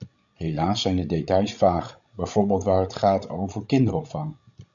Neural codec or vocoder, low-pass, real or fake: codec, 16 kHz, 16 kbps, FreqCodec, larger model; 7.2 kHz; fake